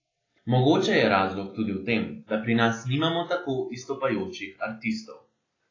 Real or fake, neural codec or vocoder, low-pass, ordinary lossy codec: real; none; 7.2 kHz; AAC, 32 kbps